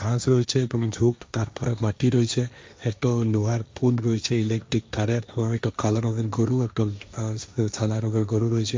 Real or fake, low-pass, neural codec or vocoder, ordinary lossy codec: fake; none; codec, 16 kHz, 1.1 kbps, Voila-Tokenizer; none